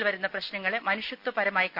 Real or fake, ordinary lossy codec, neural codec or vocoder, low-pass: real; none; none; 5.4 kHz